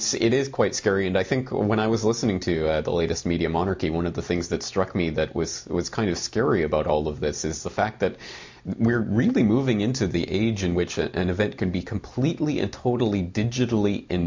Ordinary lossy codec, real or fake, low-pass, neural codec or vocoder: MP3, 48 kbps; real; 7.2 kHz; none